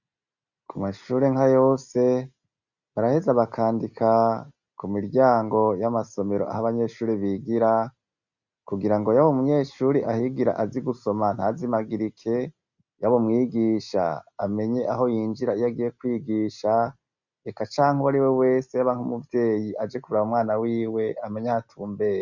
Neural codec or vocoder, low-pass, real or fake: none; 7.2 kHz; real